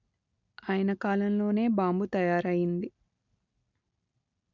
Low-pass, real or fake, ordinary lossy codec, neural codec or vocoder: 7.2 kHz; real; none; none